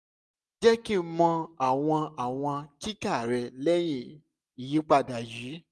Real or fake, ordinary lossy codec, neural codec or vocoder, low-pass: real; none; none; none